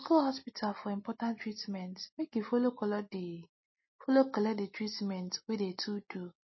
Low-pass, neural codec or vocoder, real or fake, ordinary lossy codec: 7.2 kHz; none; real; MP3, 24 kbps